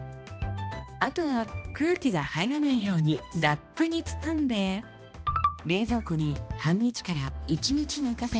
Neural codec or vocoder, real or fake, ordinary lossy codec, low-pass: codec, 16 kHz, 1 kbps, X-Codec, HuBERT features, trained on balanced general audio; fake; none; none